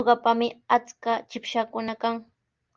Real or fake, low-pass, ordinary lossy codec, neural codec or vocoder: real; 7.2 kHz; Opus, 32 kbps; none